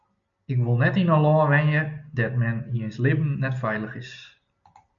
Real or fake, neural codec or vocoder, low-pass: real; none; 7.2 kHz